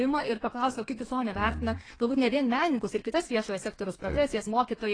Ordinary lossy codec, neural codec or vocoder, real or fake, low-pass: AAC, 32 kbps; codec, 32 kHz, 1.9 kbps, SNAC; fake; 9.9 kHz